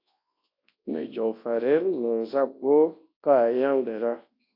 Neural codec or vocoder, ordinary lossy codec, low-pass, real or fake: codec, 24 kHz, 0.9 kbps, WavTokenizer, large speech release; AAC, 32 kbps; 5.4 kHz; fake